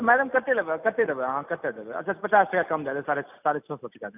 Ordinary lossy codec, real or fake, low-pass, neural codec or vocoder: none; real; 3.6 kHz; none